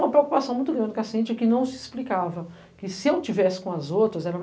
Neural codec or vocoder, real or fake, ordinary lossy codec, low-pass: none; real; none; none